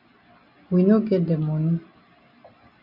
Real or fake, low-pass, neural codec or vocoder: real; 5.4 kHz; none